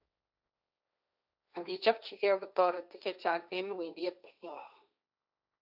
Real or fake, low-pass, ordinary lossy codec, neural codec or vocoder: fake; 5.4 kHz; none; codec, 16 kHz, 1.1 kbps, Voila-Tokenizer